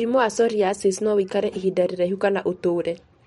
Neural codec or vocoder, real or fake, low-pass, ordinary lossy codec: vocoder, 44.1 kHz, 128 mel bands every 512 samples, BigVGAN v2; fake; 19.8 kHz; MP3, 48 kbps